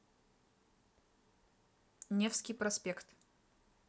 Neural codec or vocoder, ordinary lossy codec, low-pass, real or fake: none; none; none; real